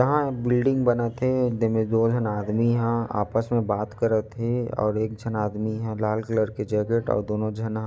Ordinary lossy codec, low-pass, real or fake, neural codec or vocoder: none; none; real; none